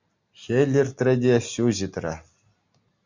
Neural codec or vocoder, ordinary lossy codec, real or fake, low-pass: none; MP3, 64 kbps; real; 7.2 kHz